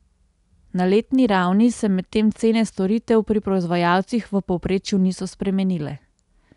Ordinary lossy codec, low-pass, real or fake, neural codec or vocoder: none; 10.8 kHz; real; none